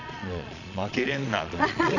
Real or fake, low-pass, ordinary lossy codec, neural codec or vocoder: fake; 7.2 kHz; none; vocoder, 22.05 kHz, 80 mel bands, Vocos